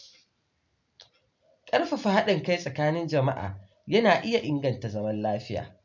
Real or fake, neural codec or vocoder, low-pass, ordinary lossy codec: real; none; 7.2 kHz; MP3, 64 kbps